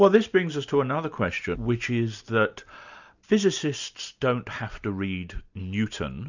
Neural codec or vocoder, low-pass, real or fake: none; 7.2 kHz; real